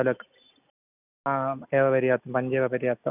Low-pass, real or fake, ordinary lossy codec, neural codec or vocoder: 3.6 kHz; real; none; none